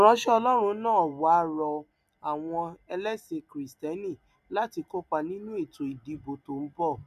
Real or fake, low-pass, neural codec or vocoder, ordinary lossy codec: real; 14.4 kHz; none; none